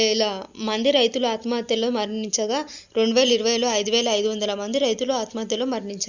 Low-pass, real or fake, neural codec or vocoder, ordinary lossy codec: 7.2 kHz; real; none; Opus, 64 kbps